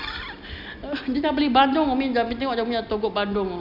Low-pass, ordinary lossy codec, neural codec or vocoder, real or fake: 5.4 kHz; none; none; real